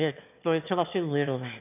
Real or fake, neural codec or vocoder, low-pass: fake; autoencoder, 22.05 kHz, a latent of 192 numbers a frame, VITS, trained on one speaker; 3.6 kHz